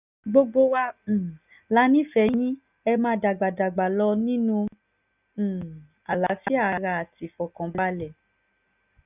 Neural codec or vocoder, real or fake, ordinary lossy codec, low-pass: none; real; none; 3.6 kHz